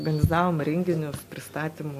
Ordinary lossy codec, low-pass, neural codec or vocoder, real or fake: MP3, 64 kbps; 14.4 kHz; none; real